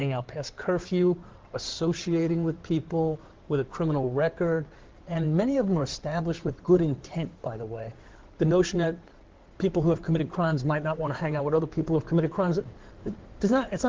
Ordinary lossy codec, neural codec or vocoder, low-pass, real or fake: Opus, 16 kbps; codec, 16 kHz in and 24 kHz out, 2.2 kbps, FireRedTTS-2 codec; 7.2 kHz; fake